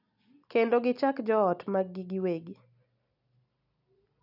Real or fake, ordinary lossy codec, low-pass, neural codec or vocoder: real; none; 5.4 kHz; none